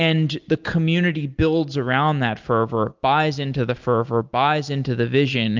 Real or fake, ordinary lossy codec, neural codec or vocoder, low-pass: real; Opus, 24 kbps; none; 7.2 kHz